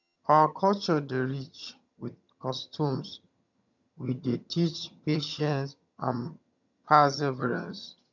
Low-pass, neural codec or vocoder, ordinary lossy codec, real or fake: 7.2 kHz; vocoder, 22.05 kHz, 80 mel bands, HiFi-GAN; none; fake